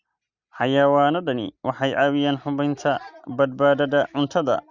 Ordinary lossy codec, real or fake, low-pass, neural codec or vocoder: Opus, 64 kbps; real; 7.2 kHz; none